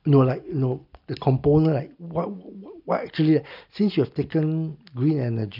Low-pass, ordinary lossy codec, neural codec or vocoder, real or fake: 5.4 kHz; none; none; real